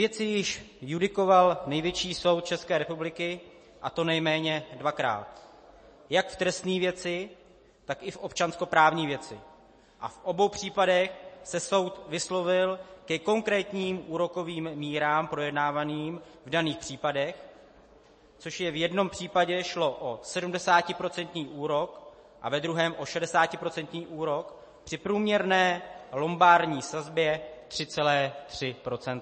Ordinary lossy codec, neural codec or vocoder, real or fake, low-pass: MP3, 32 kbps; none; real; 9.9 kHz